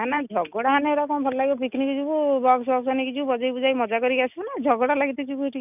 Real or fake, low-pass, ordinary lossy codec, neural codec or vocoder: real; 3.6 kHz; none; none